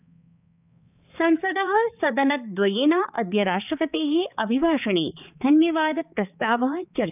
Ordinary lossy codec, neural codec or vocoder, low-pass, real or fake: none; codec, 16 kHz, 4 kbps, X-Codec, HuBERT features, trained on balanced general audio; 3.6 kHz; fake